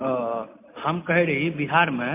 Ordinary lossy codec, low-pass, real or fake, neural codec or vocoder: MP3, 32 kbps; 3.6 kHz; real; none